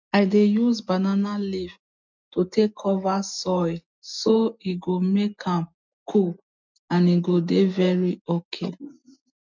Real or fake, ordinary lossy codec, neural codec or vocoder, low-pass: real; MP3, 64 kbps; none; 7.2 kHz